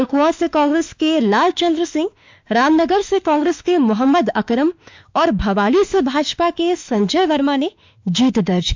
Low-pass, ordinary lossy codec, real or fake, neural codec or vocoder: 7.2 kHz; MP3, 64 kbps; fake; autoencoder, 48 kHz, 32 numbers a frame, DAC-VAE, trained on Japanese speech